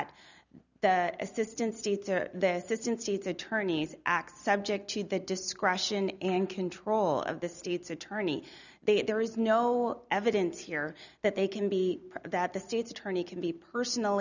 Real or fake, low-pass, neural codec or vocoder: real; 7.2 kHz; none